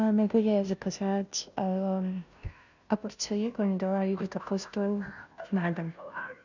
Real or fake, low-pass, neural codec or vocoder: fake; 7.2 kHz; codec, 16 kHz, 0.5 kbps, FunCodec, trained on Chinese and English, 25 frames a second